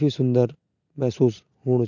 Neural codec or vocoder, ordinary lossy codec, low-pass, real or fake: none; none; 7.2 kHz; real